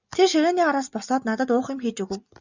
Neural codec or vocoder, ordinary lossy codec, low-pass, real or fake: none; Opus, 64 kbps; 7.2 kHz; real